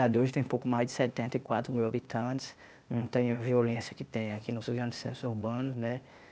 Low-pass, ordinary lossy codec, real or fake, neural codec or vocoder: none; none; fake; codec, 16 kHz, 0.8 kbps, ZipCodec